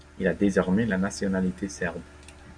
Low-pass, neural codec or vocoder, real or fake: 9.9 kHz; none; real